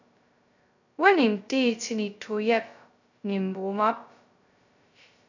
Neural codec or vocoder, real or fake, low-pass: codec, 16 kHz, 0.2 kbps, FocalCodec; fake; 7.2 kHz